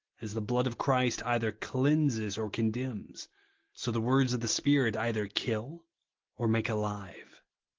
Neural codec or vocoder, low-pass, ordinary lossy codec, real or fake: none; 7.2 kHz; Opus, 16 kbps; real